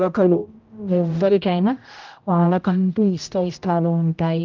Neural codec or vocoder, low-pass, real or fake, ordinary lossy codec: codec, 16 kHz, 0.5 kbps, X-Codec, HuBERT features, trained on general audio; 7.2 kHz; fake; Opus, 24 kbps